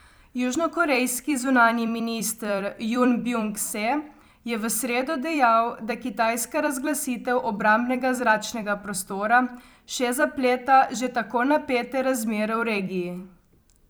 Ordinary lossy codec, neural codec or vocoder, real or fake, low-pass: none; vocoder, 44.1 kHz, 128 mel bands every 256 samples, BigVGAN v2; fake; none